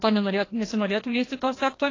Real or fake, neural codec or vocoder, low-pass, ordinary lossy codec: fake; codec, 16 kHz, 1 kbps, FreqCodec, larger model; 7.2 kHz; AAC, 32 kbps